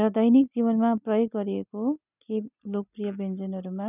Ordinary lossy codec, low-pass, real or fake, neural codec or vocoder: none; 3.6 kHz; real; none